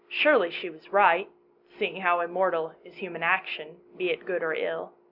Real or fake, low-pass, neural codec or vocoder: real; 5.4 kHz; none